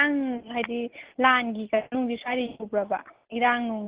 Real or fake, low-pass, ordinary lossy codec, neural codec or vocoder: real; 3.6 kHz; Opus, 16 kbps; none